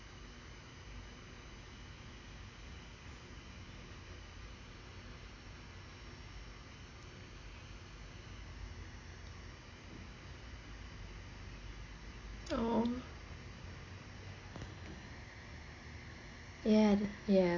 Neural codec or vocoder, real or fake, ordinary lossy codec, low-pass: none; real; AAC, 32 kbps; 7.2 kHz